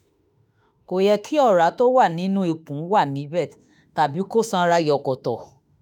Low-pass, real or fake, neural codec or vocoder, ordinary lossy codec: 19.8 kHz; fake; autoencoder, 48 kHz, 32 numbers a frame, DAC-VAE, trained on Japanese speech; none